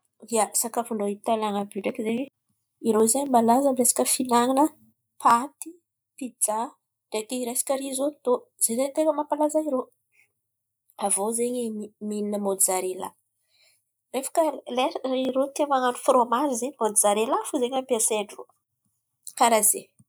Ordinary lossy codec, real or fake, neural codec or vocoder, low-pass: none; real; none; none